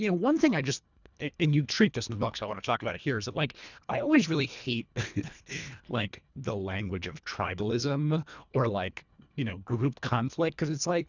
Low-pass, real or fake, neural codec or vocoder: 7.2 kHz; fake; codec, 24 kHz, 1.5 kbps, HILCodec